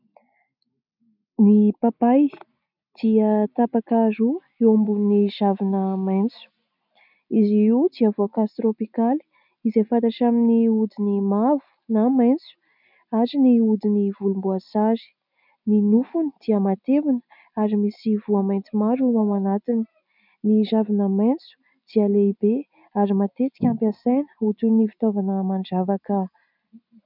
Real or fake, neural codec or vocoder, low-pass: real; none; 5.4 kHz